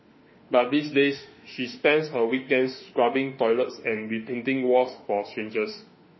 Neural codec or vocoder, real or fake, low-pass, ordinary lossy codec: autoencoder, 48 kHz, 32 numbers a frame, DAC-VAE, trained on Japanese speech; fake; 7.2 kHz; MP3, 24 kbps